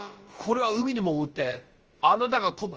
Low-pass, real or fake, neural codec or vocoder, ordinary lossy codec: 7.2 kHz; fake; codec, 16 kHz, about 1 kbps, DyCAST, with the encoder's durations; Opus, 24 kbps